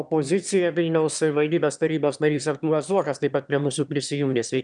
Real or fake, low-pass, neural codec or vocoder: fake; 9.9 kHz; autoencoder, 22.05 kHz, a latent of 192 numbers a frame, VITS, trained on one speaker